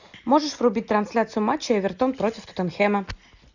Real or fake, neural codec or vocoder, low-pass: real; none; 7.2 kHz